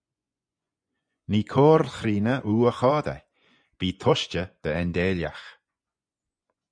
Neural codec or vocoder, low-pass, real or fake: vocoder, 24 kHz, 100 mel bands, Vocos; 9.9 kHz; fake